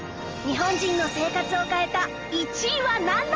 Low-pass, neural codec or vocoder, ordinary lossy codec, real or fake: 7.2 kHz; none; Opus, 24 kbps; real